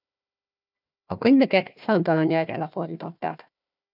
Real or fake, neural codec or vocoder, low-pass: fake; codec, 16 kHz, 1 kbps, FunCodec, trained on Chinese and English, 50 frames a second; 5.4 kHz